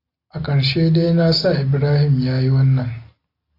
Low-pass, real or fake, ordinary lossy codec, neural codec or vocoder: 5.4 kHz; real; AAC, 32 kbps; none